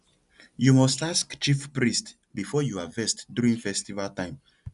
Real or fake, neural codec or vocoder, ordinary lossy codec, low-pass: real; none; none; 10.8 kHz